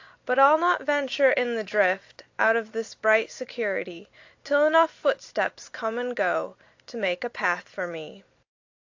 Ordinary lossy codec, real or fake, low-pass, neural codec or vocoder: AAC, 48 kbps; real; 7.2 kHz; none